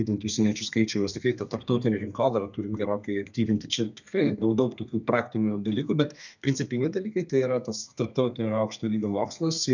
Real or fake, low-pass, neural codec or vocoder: fake; 7.2 kHz; codec, 32 kHz, 1.9 kbps, SNAC